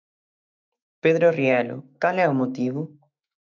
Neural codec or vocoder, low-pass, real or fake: autoencoder, 48 kHz, 128 numbers a frame, DAC-VAE, trained on Japanese speech; 7.2 kHz; fake